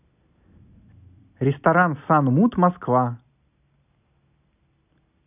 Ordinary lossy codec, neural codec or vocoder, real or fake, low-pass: none; none; real; 3.6 kHz